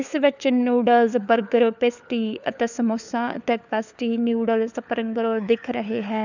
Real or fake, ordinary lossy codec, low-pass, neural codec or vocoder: fake; none; 7.2 kHz; codec, 16 kHz, 4 kbps, FunCodec, trained on LibriTTS, 50 frames a second